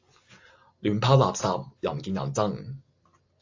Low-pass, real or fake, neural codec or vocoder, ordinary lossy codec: 7.2 kHz; real; none; AAC, 48 kbps